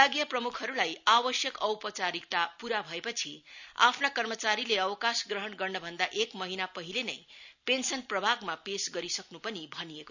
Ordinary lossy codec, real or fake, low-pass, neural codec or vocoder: none; real; 7.2 kHz; none